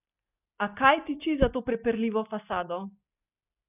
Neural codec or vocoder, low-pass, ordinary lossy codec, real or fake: none; 3.6 kHz; none; real